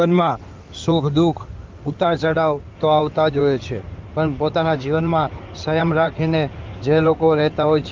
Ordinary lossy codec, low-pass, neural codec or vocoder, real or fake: Opus, 24 kbps; 7.2 kHz; codec, 16 kHz in and 24 kHz out, 2.2 kbps, FireRedTTS-2 codec; fake